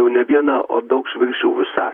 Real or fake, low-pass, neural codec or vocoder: fake; 19.8 kHz; vocoder, 44.1 kHz, 128 mel bands, Pupu-Vocoder